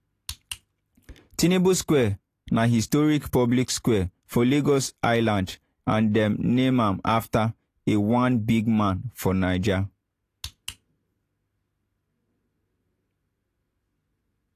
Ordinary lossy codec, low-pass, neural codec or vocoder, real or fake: AAC, 48 kbps; 14.4 kHz; none; real